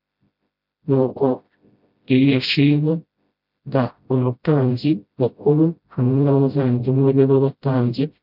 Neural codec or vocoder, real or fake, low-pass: codec, 16 kHz, 0.5 kbps, FreqCodec, smaller model; fake; 5.4 kHz